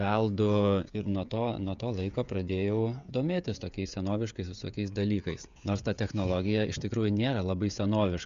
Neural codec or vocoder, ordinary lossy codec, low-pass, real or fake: codec, 16 kHz, 16 kbps, FreqCodec, smaller model; Opus, 64 kbps; 7.2 kHz; fake